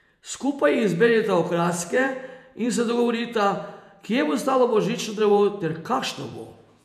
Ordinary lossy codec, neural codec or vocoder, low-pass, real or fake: none; vocoder, 48 kHz, 128 mel bands, Vocos; 14.4 kHz; fake